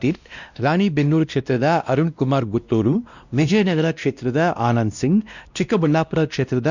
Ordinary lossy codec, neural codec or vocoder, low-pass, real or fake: none; codec, 16 kHz, 1 kbps, X-Codec, WavLM features, trained on Multilingual LibriSpeech; 7.2 kHz; fake